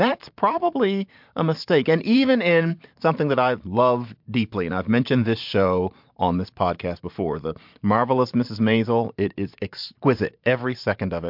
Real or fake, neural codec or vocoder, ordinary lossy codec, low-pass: fake; vocoder, 44.1 kHz, 128 mel bands every 512 samples, BigVGAN v2; MP3, 48 kbps; 5.4 kHz